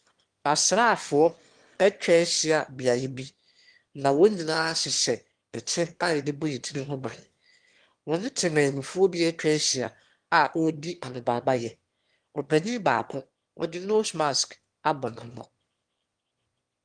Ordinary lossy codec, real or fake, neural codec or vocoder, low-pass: Opus, 24 kbps; fake; autoencoder, 22.05 kHz, a latent of 192 numbers a frame, VITS, trained on one speaker; 9.9 kHz